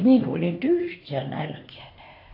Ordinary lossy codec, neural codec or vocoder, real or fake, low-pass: AAC, 32 kbps; codec, 16 kHz, 2 kbps, X-Codec, HuBERT features, trained on LibriSpeech; fake; 5.4 kHz